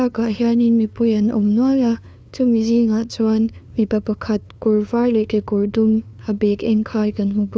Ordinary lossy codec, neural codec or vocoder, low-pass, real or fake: none; codec, 16 kHz, 2 kbps, FunCodec, trained on LibriTTS, 25 frames a second; none; fake